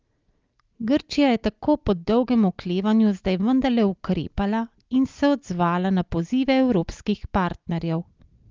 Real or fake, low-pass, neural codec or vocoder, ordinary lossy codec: real; 7.2 kHz; none; Opus, 32 kbps